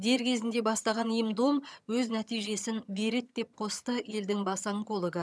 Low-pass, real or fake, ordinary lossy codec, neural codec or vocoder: none; fake; none; vocoder, 22.05 kHz, 80 mel bands, HiFi-GAN